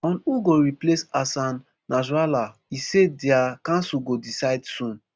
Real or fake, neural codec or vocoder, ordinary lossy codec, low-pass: real; none; none; none